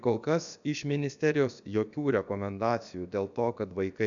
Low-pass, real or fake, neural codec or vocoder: 7.2 kHz; fake; codec, 16 kHz, about 1 kbps, DyCAST, with the encoder's durations